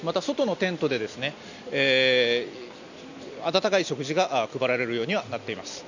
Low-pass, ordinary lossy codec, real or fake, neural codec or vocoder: 7.2 kHz; none; real; none